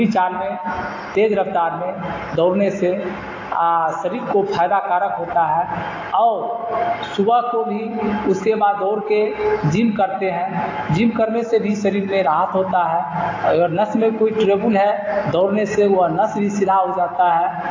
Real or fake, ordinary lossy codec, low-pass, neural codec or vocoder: real; AAC, 32 kbps; 7.2 kHz; none